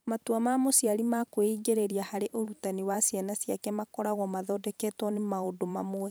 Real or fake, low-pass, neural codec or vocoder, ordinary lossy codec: fake; none; vocoder, 44.1 kHz, 128 mel bands every 512 samples, BigVGAN v2; none